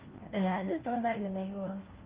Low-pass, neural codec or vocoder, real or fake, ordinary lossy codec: 3.6 kHz; codec, 16 kHz, 1 kbps, FunCodec, trained on LibriTTS, 50 frames a second; fake; Opus, 16 kbps